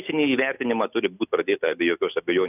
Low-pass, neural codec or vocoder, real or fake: 3.6 kHz; none; real